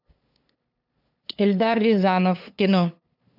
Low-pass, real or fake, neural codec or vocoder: 5.4 kHz; fake; codec, 16 kHz, 2 kbps, FunCodec, trained on LibriTTS, 25 frames a second